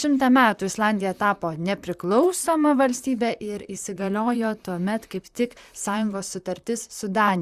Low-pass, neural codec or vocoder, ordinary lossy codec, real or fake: 14.4 kHz; vocoder, 44.1 kHz, 128 mel bands, Pupu-Vocoder; Opus, 64 kbps; fake